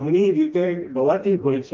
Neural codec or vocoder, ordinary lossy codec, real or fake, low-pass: codec, 16 kHz, 1 kbps, FreqCodec, smaller model; Opus, 24 kbps; fake; 7.2 kHz